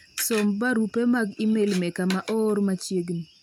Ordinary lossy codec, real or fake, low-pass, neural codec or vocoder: none; real; 19.8 kHz; none